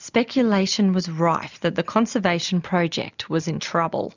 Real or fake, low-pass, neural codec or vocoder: real; 7.2 kHz; none